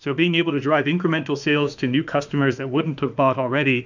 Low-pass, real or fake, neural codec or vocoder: 7.2 kHz; fake; autoencoder, 48 kHz, 32 numbers a frame, DAC-VAE, trained on Japanese speech